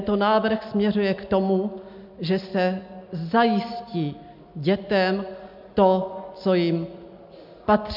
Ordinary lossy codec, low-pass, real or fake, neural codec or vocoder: MP3, 48 kbps; 5.4 kHz; real; none